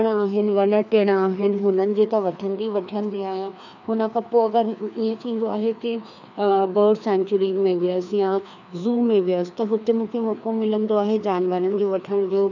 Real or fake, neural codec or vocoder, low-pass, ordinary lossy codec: fake; codec, 16 kHz, 2 kbps, FreqCodec, larger model; 7.2 kHz; none